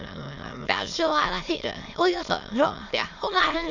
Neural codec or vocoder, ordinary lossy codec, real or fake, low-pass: autoencoder, 22.05 kHz, a latent of 192 numbers a frame, VITS, trained on many speakers; none; fake; 7.2 kHz